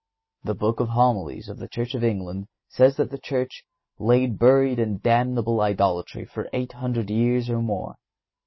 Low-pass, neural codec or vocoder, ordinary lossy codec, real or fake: 7.2 kHz; none; MP3, 24 kbps; real